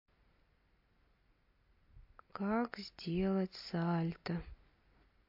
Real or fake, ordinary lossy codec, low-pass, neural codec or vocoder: real; MP3, 32 kbps; 5.4 kHz; none